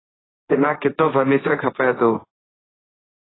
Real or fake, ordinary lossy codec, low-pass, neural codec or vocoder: fake; AAC, 16 kbps; 7.2 kHz; codec, 16 kHz, 1.1 kbps, Voila-Tokenizer